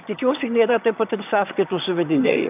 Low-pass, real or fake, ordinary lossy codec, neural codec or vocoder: 3.6 kHz; fake; AAC, 32 kbps; vocoder, 22.05 kHz, 80 mel bands, HiFi-GAN